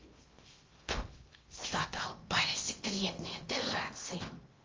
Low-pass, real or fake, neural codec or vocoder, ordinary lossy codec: 7.2 kHz; fake; codec, 16 kHz in and 24 kHz out, 0.8 kbps, FocalCodec, streaming, 65536 codes; Opus, 32 kbps